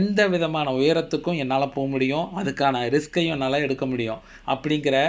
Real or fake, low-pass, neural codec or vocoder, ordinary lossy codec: real; none; none; none